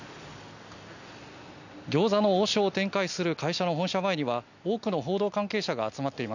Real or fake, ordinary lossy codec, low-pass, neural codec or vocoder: real; none; 7.2 kHz; none